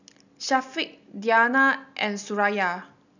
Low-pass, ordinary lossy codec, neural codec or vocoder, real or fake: 7.2 kHz; none; none; real